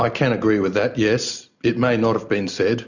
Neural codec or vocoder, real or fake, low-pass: none; real; 7.2 kHz